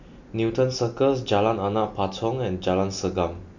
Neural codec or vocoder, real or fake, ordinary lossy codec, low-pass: none; real; none; 7.2 kHz